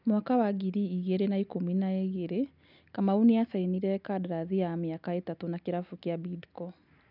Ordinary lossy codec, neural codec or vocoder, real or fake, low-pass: none; none; real; 5.4 kHz